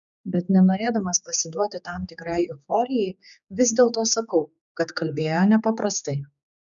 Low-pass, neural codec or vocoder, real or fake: 7.2 kHz; codec, 16 kHz, 4 kbps, X-Codec, HuBERT features, trained on general audio; fake